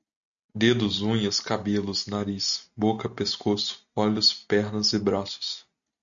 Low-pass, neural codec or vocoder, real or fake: 7.2 kHz; none; real